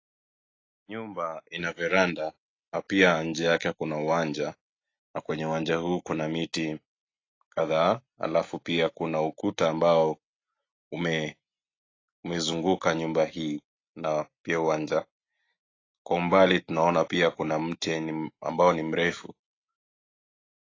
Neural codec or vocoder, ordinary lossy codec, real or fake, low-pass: none; AAC, 32 kbps; real; 7.2 kHz